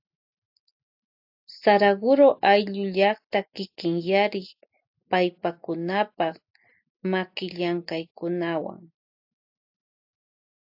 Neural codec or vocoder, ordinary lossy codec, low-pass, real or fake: none; AAC, 48 kbps; 5.4 kHz; real